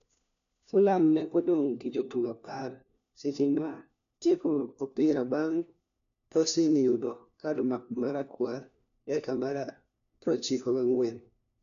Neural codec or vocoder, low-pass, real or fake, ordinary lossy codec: codec, 16 kHz, 1 kbps, FunCodec, trained on LibriTTS, 50 frames a second; 7.2 kHz; fake; none